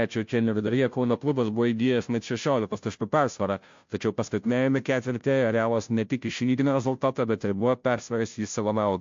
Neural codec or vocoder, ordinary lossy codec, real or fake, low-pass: codec, 16 kHz, 0.5 kbps, FunCodec, trained on Chinese and English, 25 frames a second; MP3, 48 kbps; fake; 7.2 kHz